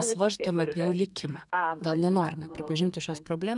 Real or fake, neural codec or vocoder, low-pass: fake; codec, 32 kHz, 1.9 kbps, SNAC; 10.8 kHz